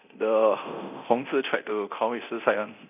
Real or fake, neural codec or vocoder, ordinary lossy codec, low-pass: fake; codec, 24 kHz, 0.9 kbps, DualCodec; none; 3.6 kHz